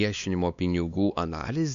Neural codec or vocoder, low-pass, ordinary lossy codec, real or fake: codec, 16 kHz, 2 kbps, X-Codec, WavLM features, trained on Multilingual LibriSpeech; 7.2 kHz; MP3, 96 kbps; fake